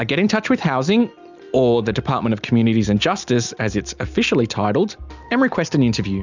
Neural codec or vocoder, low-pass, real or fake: none; 7.2 kHz; real